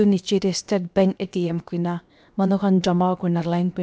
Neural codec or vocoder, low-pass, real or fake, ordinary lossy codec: codec, 16 kHz, 0.7 kbps, FocalCodec; none; fake; none